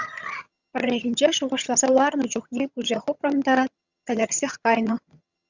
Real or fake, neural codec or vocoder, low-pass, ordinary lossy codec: fake; vocoder, 22.05 kHz, 80 mel bands, HiFi-GAN; 7.2 kHz; Opus, 64 kbps